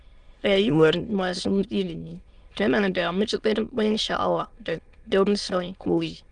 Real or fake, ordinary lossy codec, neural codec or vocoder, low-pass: fake; Opus, 32 kbps; autoencoder, 22.05 kHz, a latent of 192 numbers a frame, VITS, trained on many speakers; 9.9 kHz